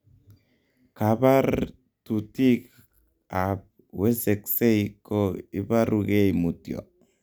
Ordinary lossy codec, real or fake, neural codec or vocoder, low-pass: none; real; none; none